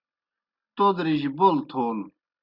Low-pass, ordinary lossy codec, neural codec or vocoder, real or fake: 5.4 kHz; Opus, 64 kbps; none; real